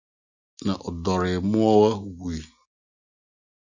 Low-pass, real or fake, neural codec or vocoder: 7.2 kHz; real; none